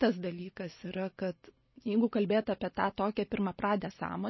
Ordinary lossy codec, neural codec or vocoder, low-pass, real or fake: MP3, 24 kbps; none; 7.2 kHz; real